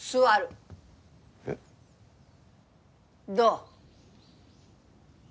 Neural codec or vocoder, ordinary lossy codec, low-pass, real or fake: none; none; none; real